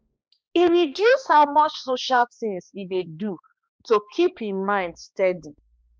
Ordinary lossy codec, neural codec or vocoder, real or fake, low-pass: none; codec, 16 kHz, 2 kbps, X-Codec, HuBERT features, trained on balanced general audio; fake; none